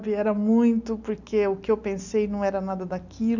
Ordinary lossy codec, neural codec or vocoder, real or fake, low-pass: none; none; real; 7.2 kHz